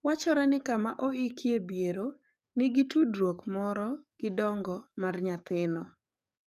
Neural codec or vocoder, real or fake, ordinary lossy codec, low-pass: codec, 44.1 kHz, 7.8 kbps, DAC; fake; none; 14.4 kHz